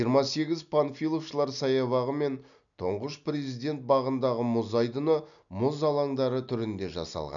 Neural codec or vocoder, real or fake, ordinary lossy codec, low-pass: none; real; none; 7.2 kHz